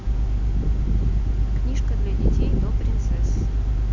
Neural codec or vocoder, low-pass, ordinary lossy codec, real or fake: none; 7.2 kHz; none; real